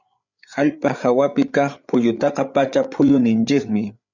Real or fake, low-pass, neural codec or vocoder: fake; 7.2 kHz; codec, 16 kHz, 8 kbps, FreqCodec, larger model